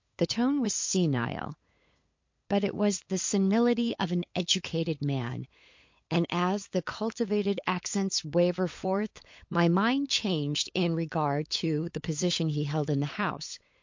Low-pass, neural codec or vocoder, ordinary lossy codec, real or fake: 7.2 kHz; codec, 16 kHz, 8 kbps, FunCodec, trained on LibriTTS, 25 frames a second; MP3, 48 kbps; fake